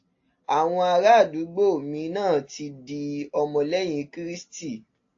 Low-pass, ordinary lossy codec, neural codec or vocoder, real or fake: 7.2 kHz; AAC, 48 kbps; none; real